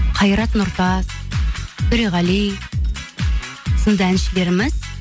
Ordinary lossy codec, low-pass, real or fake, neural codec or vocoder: none; none; real; none